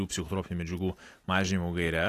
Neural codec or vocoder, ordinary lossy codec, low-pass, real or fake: none; AAC, 48 kbps; 14.4 kHz; real